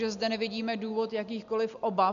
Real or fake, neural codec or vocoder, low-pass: real; none; 7.2 kHz